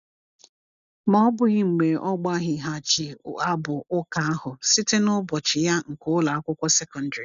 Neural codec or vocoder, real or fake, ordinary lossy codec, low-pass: none; real; none; 7.2 kHz